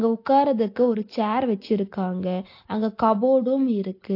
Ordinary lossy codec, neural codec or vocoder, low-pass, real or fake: AAC, 32 kbps; none; 5.4 kHz; real